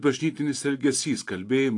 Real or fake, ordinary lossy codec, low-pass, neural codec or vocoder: real; AAC, 48 kbps; 10.8 kHz; none